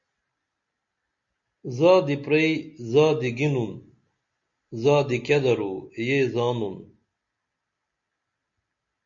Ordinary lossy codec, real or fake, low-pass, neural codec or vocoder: MP3, 48 kbps; real; 7.2 kHz; none